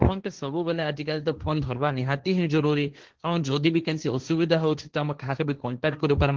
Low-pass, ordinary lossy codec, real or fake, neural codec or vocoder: 7.2 kHz; Opus, 16 kbps; fake; codec, 16 kHz, 1.1 kbps, Voila-Tokenizer